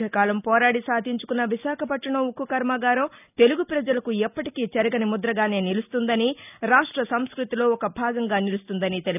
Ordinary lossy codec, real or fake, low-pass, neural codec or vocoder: none; real; 3.6 kHz; none